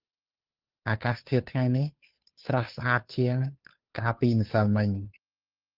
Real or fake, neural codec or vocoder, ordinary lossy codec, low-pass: fake; codec, 16 kHz, 2 kbps, FunCodec, trained on Chinese and English, 25 frames a second; Opus, 32 kbps; 5.4 kHz